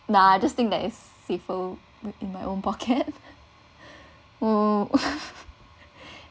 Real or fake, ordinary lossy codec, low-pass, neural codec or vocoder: real; none; none; none